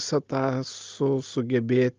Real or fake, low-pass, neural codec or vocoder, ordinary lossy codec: real; 7.2 kHz; none; Opus, 24 kbps